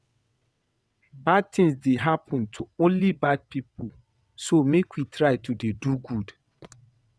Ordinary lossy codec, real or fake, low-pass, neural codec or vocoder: none; fake; none; vocoder, 22.05 kHz, 80 mel bands, WaveNeXt